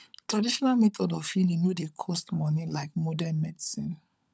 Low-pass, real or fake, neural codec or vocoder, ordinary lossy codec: none; fake; codec, 16 kHz, 16 kbps, FunCodec, trained on LibriTTS, 50 frames a second; none